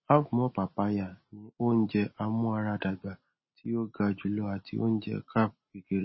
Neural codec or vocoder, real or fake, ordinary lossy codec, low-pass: none; real; MP3, 24 kbps; 7.2 kHz